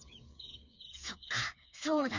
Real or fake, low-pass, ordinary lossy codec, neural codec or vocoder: fake; 7.2 kHz; none; codec, 16 kHz, 4 kbps, FreqCodec, smaller model